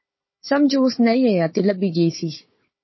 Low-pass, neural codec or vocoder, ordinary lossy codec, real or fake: 7.2 kHz; codec, 16 kHz, 4 kbps, FunCodec, trained on Chinese and English, 50 frames a second; MP3, 24 kbps; fake